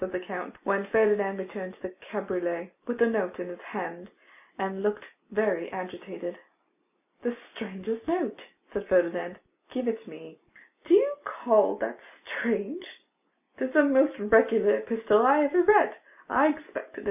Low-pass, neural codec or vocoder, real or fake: 3.6 kHz; none; real